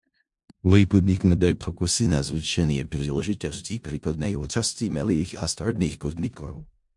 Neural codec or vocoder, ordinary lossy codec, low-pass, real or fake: codec, 16 kHz in and 24 kHz out, 0.4 kbps, LongCat-Audio-Codec, four codebook decoder; MP3, 64 kbps; 10.8 kHz; fake